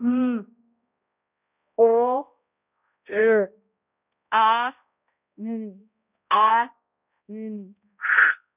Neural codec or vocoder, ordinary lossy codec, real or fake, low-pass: codec, 16 kHz, 0.5 kbps, X-Codec, HuBERT features, trained on balanced general audio; none; fake; 3.6 kHz